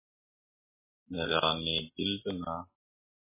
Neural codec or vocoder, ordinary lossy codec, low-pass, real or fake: none; MP3, 16 kbps; 3.6 kHz; real